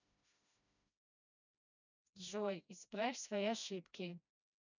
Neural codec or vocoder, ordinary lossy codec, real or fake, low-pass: codec, 16 kHz, 1 kbps, FreqCodec, smaller model; none; fake; 7.2 kHz